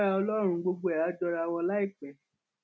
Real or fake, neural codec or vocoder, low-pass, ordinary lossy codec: real; none; none; none